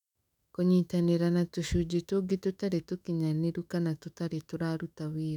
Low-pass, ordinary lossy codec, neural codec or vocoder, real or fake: 19.8 kHz; none; autoencoder, 48 kHz, 128 numbers a frame, DAC-VAE, trained on Japanese speech; fake